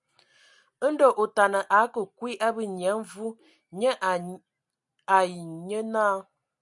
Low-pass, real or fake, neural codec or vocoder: 10.8 kHz; real; none